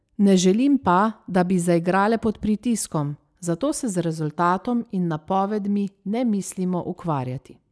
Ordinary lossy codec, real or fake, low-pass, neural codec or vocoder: none; real; none; none